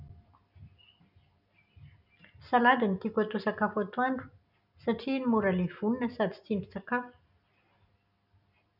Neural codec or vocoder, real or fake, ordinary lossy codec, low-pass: none; real; none; 5.4 kHz